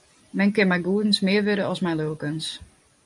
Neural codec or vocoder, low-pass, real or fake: vocoder, 44.1 kHz, 128 mel bands every 256 samples, BigVGAN v2; 10.8 kHz; fake